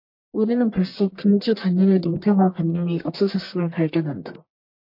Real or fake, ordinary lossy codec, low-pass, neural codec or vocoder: fake; MP3, 32 kbps; 5.4 kHz; codec, 44.1 kHz, 1.7 kbps, Pupu-Codec